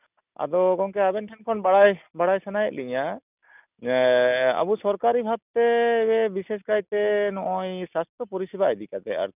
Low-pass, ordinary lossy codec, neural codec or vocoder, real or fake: 3.6 kHz; none; none; real